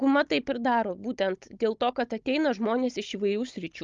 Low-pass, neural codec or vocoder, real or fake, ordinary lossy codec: 7.2 kHz; codec, 16 kHz, 16 kbps, FunCodec, trained on Chinese and English, 50 frames a second; fake; Opus, 32 kbps